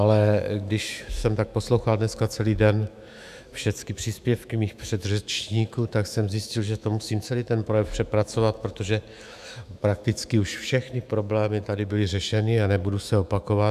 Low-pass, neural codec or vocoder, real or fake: 14.4 kHz; autoencoder, 48 kHz, 128 numbers a frame, DAC-VAE, trained on Japanese speech; fake